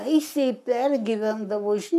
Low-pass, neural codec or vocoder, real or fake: 14.4 kHz; codec, 44.1 kHz, 7.8 kbps, Pupu-Codec; fake